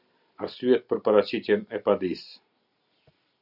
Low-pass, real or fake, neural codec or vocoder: 5.4 kHz; real; none